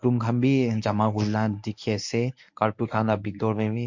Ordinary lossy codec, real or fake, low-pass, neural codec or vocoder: MP3, 48 kbps; fake; 7.2 kHz; codec, 24 kHz, 0.9 kbps, WavTokenizer, medium speech release version 1